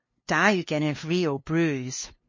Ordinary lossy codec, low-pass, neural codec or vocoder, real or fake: MP3, 32 kbps; 7.2 kHz; codec, 16 kHz, 2 kbps, FunCodec, trained on LibriTTS, 25 frames a second; fake